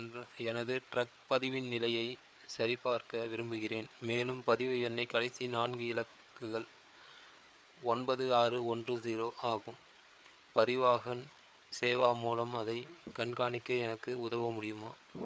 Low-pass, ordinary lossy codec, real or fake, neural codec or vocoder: none; none; fake; codec, 16 kHz, 4 kbps, FreqCodec, larger model